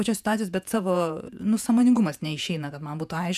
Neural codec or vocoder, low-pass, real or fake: vocoder, 48 kHz, 128 mel bands, Vocos; 14.4 kHz; fake